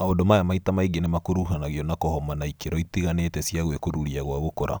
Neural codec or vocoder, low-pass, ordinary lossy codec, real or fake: none; none; none; real